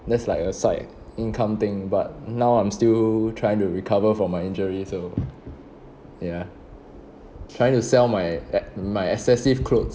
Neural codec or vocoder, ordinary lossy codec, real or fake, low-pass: none; none; real; none